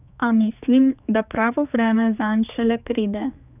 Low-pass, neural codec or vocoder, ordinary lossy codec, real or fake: 3.6 kHz; codec, 16 kHz, 4 kbps, X-Codec, HuBERT features, trained on general audio; none; fake